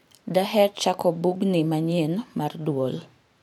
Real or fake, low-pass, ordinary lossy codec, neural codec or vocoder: fake; 19.8 kHz; none; vocoder, 44.1 kHz, 128 mel bands every 512 samples, BigVGAN v2